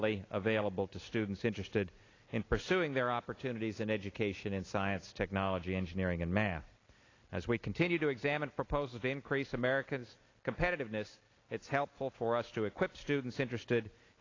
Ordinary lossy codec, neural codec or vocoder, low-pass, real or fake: AAC, 32 kbps; none; 7.2 kHz; real